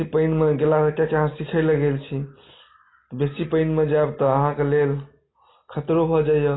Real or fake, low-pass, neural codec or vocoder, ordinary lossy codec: real; 7.2 kHz; none; AAC, 16 kbps